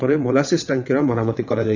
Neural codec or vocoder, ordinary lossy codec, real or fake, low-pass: vocoder, 22.05 kHz, 80 mel bands, WaveNeXt; none; fake; 7.2 kHz